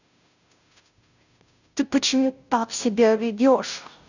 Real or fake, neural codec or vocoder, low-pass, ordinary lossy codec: fake; codec, 16 kHz, 0.5 kbps, FunCodec, trained on Chinese and English, 25 frames a second; 7.2 kHz; none